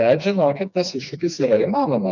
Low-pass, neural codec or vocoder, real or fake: 7.2 kHz; codec, 16 kHz, 2 kbps, FreqCodec, smaller model; fake